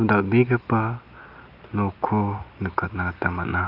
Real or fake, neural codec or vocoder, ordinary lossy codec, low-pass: real; none; Opus, 24 kbps; 5.4 kHz